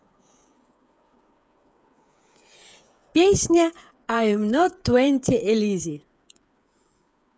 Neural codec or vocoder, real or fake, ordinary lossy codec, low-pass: codec, 16 kHz, 8 kbps, FreqCodec, smaller model; fake; none; none